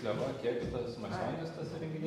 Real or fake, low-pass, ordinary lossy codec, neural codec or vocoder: real; 19.8 kHz; MP3, 64 kbps; none